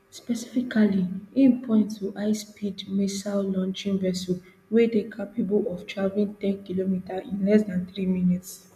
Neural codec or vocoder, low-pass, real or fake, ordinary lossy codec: none; 14.4 kHz; real; MP3, 96 kbps